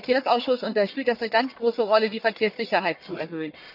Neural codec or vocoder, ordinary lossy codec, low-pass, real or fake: codec, 44.1 kHz, 1.7 kbps, Pupu-Codec; none; 5.4 kHz; fake